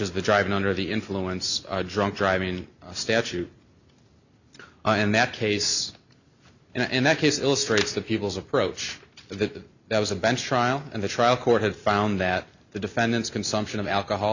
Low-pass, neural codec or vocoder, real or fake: 7.2 kHz; none; real